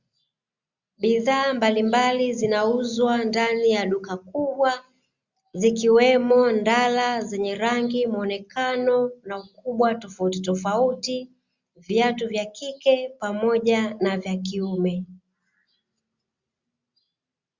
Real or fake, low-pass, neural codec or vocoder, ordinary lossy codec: real; 7.2 kHz; none; Opus, 64 kbps